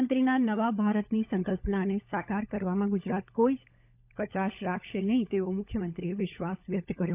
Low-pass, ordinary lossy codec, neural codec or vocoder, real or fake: 3.6 kHz; none; codec, 16 kHz, 16 kbps, FunCodec, trained on LibriTTS, 50 frames a second; fake